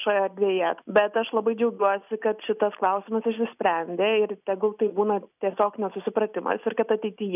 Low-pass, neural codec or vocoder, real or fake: 3.6 kHz; none; real